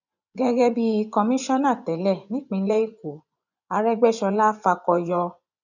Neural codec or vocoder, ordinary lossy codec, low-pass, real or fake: vocoder, 24 kHz, 100 mel bands, Vocos; none; 7.2 kHz; fake